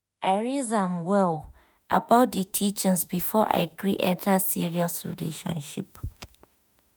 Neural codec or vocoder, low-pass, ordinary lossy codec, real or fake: autoencoder, 48 kHz, 32 numbers a frame, DAC-VAE, trained on Japanese speech; none; none; fake